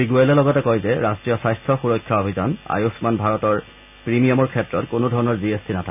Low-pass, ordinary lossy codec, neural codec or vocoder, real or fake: 3.6 kHz; none; none; real